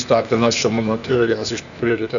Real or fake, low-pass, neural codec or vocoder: fake; 7.2 kHz; codec, 16 kHz, 0.8 kbps, ZipCodec